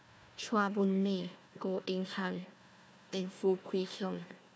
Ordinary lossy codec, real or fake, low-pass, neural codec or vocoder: none; fake; none; codec, 16 kHz, 1 kbps, FunCodec, trained on Chinese and English, 50 frames a second